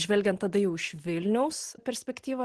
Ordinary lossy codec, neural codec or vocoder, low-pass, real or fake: Opus, 16 kbps; none; 10.8 kHz; real